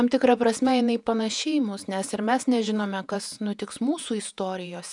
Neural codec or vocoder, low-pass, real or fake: vocoder, 48 kHz, 128 mel bands, Vocos; 10.8 kHz; fake